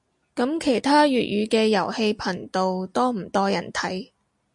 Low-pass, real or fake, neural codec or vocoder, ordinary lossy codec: 10.8 kHz; real; none; MP3, 64 kbps